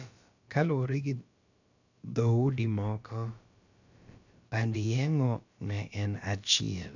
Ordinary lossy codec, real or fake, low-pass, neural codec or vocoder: none; fake; 7.2 kHz; codec, 16 kHz, about 1 kbps, DyCAST, with the encoder's durations